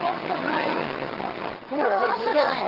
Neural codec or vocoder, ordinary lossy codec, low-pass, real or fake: vocoder, 22.05 kHz, 80 mel bands, HiFi-GAN; Opus, 16 kbps; 5.4 kHz; fake